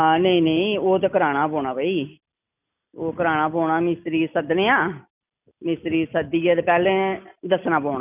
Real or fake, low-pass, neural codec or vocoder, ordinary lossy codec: real; 3.6 kHz; none; none